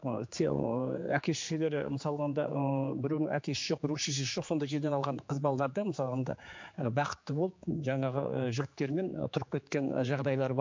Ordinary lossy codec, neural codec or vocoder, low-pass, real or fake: MP3, 48 kbps; codec, 16 kHz, 4 kbps, X-Codec, HuBERT features, trained on general audio; 7.2 kHz; fake